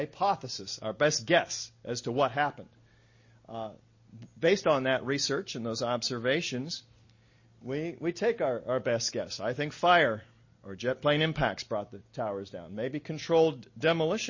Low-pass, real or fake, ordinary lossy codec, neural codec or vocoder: 7.2 kHz; real; MP3, 32 kbps; none